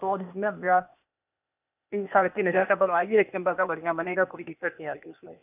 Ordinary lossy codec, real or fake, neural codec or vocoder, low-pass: none; fake; codec, 16 kHz, 0.8 kbps, ZipCodec; 3.6 kHz